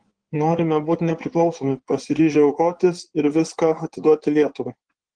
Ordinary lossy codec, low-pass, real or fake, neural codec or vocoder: Opus, 16 kbps; 9.9 kHz; fake; codec, 16 kHz in and 24 kHz out, 2.2 kbps, FireRedTTS-2 codec